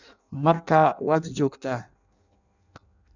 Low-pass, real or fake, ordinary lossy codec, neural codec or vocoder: 7.2 kHz; fake; none; codec, 16 kHz in and 24 kHz out, 0.6 kbps, FireRedTTS-2 codec